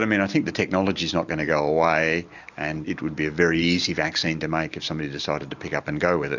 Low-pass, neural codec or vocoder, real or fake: 7.2 kHz; none; real